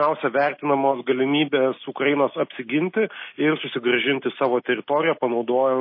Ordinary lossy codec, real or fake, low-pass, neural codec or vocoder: MP3, 24 kbps; real; 5.4 kHz; none